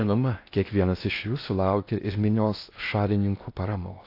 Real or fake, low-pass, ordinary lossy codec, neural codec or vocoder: fake; 5.4 kHz; AAC, 32 kbps; codec, 16 kHz in and 24 kHz out, 0.6 kbps, FocalCodec, streaming, 4096 codes